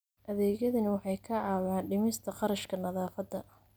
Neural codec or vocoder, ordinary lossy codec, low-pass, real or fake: none; none; none; real